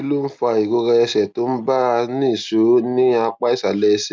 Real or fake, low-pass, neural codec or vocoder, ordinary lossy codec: real; none; none; none